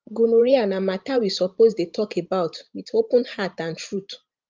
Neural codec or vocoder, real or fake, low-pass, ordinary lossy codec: vocoder, 44.1 kHz, 128 mel bands every 512 samples, BigVGAN v2; fake; 7.2 kHz; Opus, 32 kbps